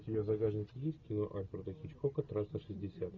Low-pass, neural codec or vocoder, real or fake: 7.2 kHz; none; real